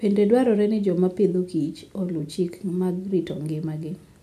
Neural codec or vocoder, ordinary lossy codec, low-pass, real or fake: none; none; 14.4 kHz; real